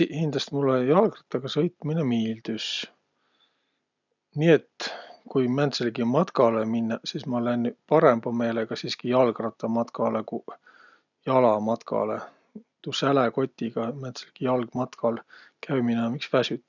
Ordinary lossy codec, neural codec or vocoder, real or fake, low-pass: none; none; real; 7.2 kHz